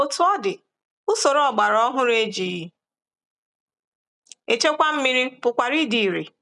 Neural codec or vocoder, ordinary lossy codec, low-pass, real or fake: none; none; 10.8 kHz; real